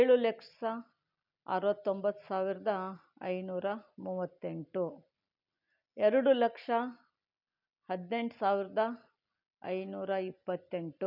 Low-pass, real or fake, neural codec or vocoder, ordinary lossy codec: 5.4 kHz; real; none; none